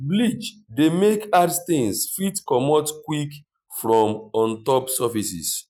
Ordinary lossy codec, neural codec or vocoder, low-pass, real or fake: none; none; 19.8 kHz; real